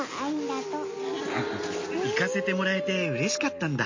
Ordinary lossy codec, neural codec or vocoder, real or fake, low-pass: MP3, 32 kbps; none; real; 7.2 kHz